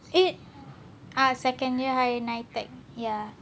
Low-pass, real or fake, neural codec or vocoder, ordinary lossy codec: none; real; none; none